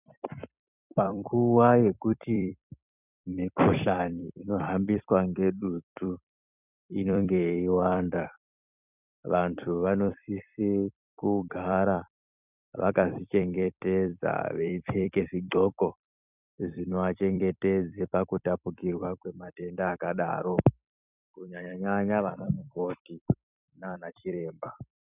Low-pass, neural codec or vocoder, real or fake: 3.6 kHz; vocoder, 44.1 kHz, 128 mel bands every 256 samples, BigVGAN v2; fake